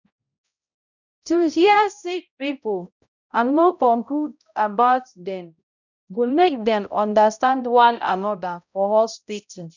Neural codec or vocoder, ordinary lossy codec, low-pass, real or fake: codec, 16 kHz, 0.5 kbps, X-Codec, HuBERT features, trained on balanced general audio; none; 7.2 kHz; fake